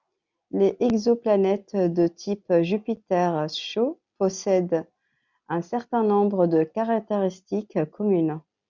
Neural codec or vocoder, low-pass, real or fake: none; 7.2 kHz; real